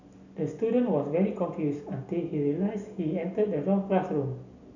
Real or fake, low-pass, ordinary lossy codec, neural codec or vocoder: real; 7.2 kHz; none; none